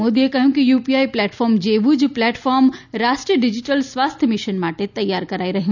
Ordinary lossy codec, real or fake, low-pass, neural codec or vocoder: none; real; 7.2 kHz; none